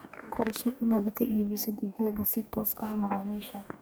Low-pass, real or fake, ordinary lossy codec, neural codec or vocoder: none; fake; none; codec, 44.1 kHz, 2.6 kbps, DAC